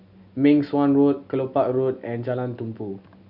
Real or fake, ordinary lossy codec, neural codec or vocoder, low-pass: real; none; none; 5.4 kHz